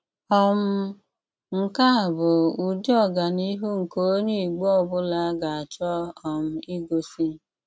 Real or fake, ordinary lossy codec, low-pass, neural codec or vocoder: real; none; none; none